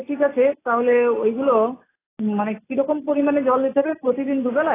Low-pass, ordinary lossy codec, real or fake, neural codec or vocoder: 3.6 kHz; AAC, 16 kbps; real; none